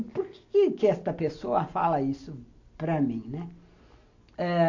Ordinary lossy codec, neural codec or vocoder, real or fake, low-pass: AAC, 48 kbps; none; real; 7.2 kHz